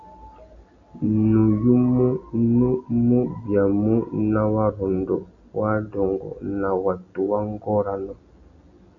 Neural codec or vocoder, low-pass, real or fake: none; 7.2 kHz; real